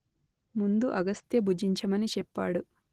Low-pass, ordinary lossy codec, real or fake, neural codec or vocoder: 14.4 kHz; Opus, 16 kbps; real; none